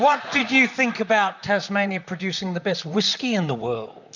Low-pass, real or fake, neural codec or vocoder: 7.2 kHz; fake; vocoder, 44.1 kHz, 128 mel bands, Pupu-Vocoder